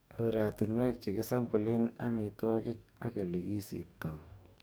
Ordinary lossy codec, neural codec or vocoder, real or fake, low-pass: none; codec, 44.1 kHz, 2.6 kbps, DAC; fake; none